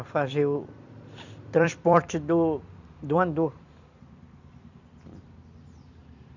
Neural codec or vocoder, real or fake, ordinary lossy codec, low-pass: vocoder, 44.1 kHz, 128 mel bands every 256 samples, BigVGAN v2; fake; none; 7.2 kHz